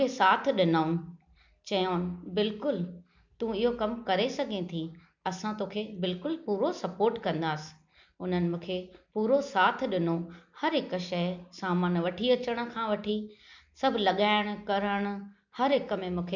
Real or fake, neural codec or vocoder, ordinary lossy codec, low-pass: real; none; MP3, 64 kbps; 7.2 kHz